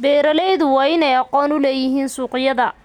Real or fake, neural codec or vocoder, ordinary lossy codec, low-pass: real; none; none; 19.8 kHz